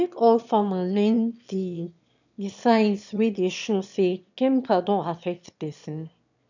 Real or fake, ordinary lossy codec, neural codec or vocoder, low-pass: fake; none; autoencoder, 22.05 kHz, a latent of 192 numbers a frame, VITS, trained on one speaker; 7.2 kHz